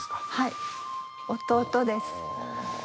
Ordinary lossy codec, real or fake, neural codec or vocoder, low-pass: none; real; none; none